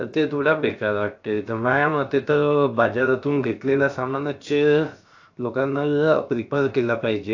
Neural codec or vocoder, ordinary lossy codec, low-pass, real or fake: codec, 16 kHz, 0.7 kbps, FocalCodec; AAC, 48 kbps; 7.2 kHz; fake